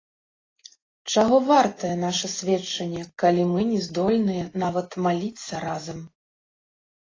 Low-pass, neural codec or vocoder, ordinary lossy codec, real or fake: 7.2 kHz; none; AAC, 32 kbps; real